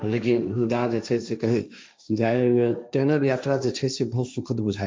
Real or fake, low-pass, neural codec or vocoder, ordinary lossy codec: fake; none; codec, 16 kHz, 1.1 kbps, Voila-Tokenizer; none